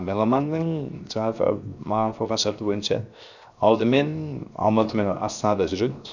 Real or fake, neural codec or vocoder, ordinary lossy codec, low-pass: fake; codec, 16 kHz, 0.7 kbps, FocalCodec; none; 7.2 kHz